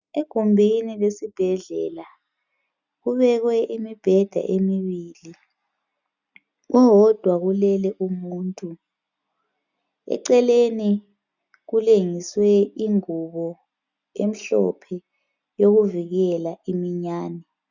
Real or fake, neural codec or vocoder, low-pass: real; none; 7.2 kHz